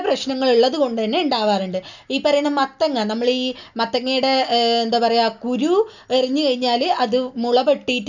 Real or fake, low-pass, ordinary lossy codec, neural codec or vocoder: real; 7.2 kHz; none; none